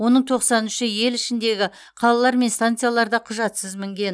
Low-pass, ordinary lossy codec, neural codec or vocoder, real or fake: none; none; none; real